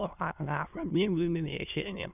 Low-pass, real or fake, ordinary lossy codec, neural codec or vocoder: 3.6 kHz; fake; none; autoencoder, 22.05 kHz, a latent of 192 numbers a frame, VITS, trained on many speakers